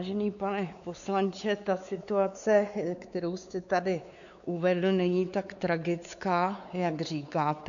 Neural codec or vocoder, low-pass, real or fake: codec, 16 kHz, 4 kbps, X-Codec, WavLM features, trained on Multilingual LibriSpeech; 7.2 kHz; fake